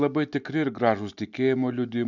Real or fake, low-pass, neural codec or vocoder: real; 7.2 kHz; none